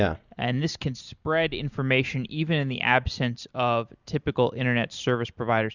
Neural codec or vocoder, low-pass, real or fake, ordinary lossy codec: none; 7.2 kHz; real; Opus, 64 kbps